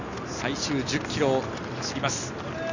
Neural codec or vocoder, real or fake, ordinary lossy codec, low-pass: none; real; none; 7.2 kHz